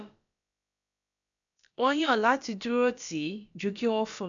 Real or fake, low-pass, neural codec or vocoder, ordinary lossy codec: fake; 7.2 kHz; codec, 16 kHz, about 1 kbps, DyCAST, with the encoder's durations; none